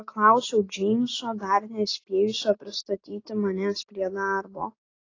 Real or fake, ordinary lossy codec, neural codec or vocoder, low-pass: real; AAC, 32 kbps; none; 7.2 kHz